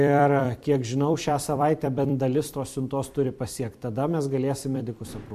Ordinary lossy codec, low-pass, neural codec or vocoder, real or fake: MP3, 64 kbps; 14.4 kHz; vocoder, 44.1 kHz, 128 mel bands every 256 samples, BigVGAN v2; fake